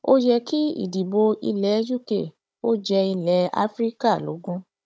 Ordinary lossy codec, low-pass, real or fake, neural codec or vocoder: none; none; fake; codec, 16 kHz, 16 kbps, FunCodec, trained on Chinese and English, 50 frames a second